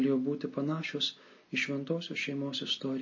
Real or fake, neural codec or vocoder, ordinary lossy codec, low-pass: real; none; MP3, 32 kbps; 7.2 kHz